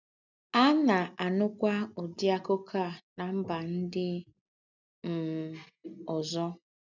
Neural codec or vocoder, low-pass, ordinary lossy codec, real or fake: none; 7.2 kHz; none; real